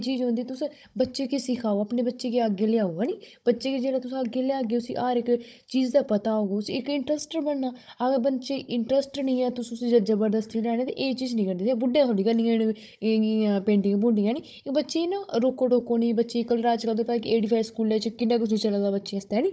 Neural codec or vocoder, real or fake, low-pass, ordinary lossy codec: codec, 16 kHz, 16 kbps, FunCodec, trained on Chinese and English, 50 frames a second; fake; none; none